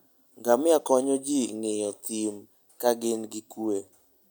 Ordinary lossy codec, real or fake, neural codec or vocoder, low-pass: none; real; none; none